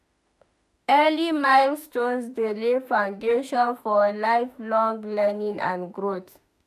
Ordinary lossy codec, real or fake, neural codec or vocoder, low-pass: none; fake; autoencoder, 48 kHz, 32 numbers a frame, DAC-VAE, trained on Japanese speech; 14.4 kHz